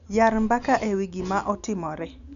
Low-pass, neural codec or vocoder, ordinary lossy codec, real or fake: 7.2 kHz; none; none; real